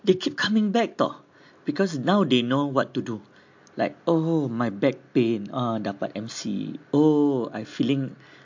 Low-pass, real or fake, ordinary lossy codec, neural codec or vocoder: 7.2 kHz; real; MP3, 48 kbps; none